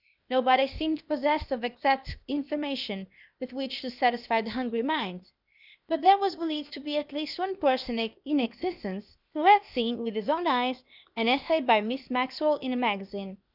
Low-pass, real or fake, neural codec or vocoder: 5.4 kHz; fake; codec, 16 kHz, 0.8 kbps, ZipCodec